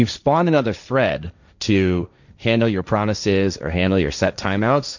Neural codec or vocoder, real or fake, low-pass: codec, 16 kHz, 1.1 kbps, Voila-Tokenizer; fake; 7.2 kHz